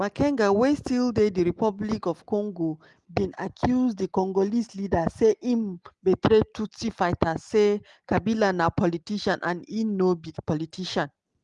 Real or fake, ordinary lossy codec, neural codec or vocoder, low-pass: real; Opus, 24 kbps; none; 10.8 kHz